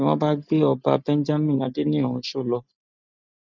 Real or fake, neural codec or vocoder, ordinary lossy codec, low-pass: real; none; none; 7.2 kHz